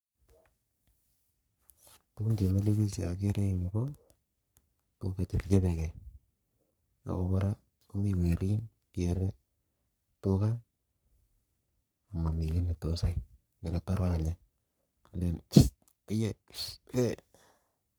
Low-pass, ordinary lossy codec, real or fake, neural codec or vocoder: none; none; fake; codec, 44.1 kHz, 3.4 kbps, Pupu-Codec